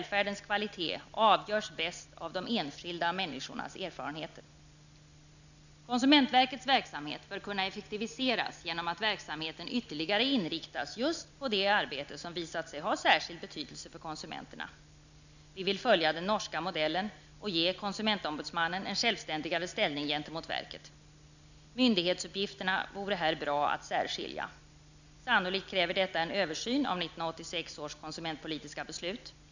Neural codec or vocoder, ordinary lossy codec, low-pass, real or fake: none; none; 7.2 kHz; real